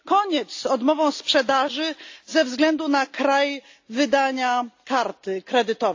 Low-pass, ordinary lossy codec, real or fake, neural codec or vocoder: 7.2 kHz; AAC, 48 kbps; real; none